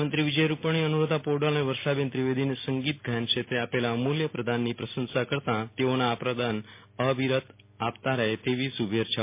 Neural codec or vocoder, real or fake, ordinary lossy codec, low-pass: none; real; MP3, 24 kbps; 3.6 kHz